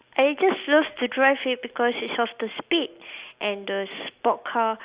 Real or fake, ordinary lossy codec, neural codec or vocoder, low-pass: real; Opus, 64 kbps; none; 3.6 kHz